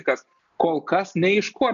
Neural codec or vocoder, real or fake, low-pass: none; real; 7.2 kHz